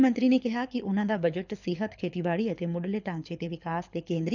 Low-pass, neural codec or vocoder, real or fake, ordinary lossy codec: 7.2 kHz; codec, 24 kHz, 6 kbps, HILCodec; fake; none